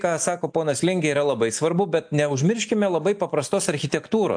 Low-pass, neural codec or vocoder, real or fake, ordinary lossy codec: 9.9 kHz; none; real; AAC, 64 kbps